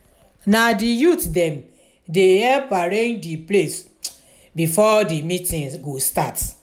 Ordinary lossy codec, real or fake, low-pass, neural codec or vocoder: none; real; 19.8 kHz; none